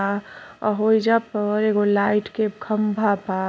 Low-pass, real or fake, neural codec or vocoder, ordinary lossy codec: none; real; none; none